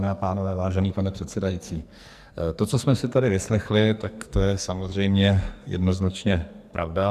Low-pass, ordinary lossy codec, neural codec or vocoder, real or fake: 14.4 kHz; Opus, 64 kbps; codec, 44.1 kHz, 2.6 kbps, SNAC; fake